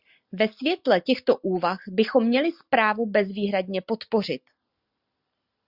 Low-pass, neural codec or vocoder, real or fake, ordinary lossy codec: 5.4 kHz; none; real; Opus, 64 kbps